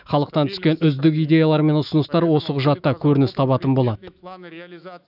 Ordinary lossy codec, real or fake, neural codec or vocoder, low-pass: none; fake; autoencoder, 48 kHz, 128 numbers a frame, DAC-VAE, trained on Japanese speech; 5.4 kHz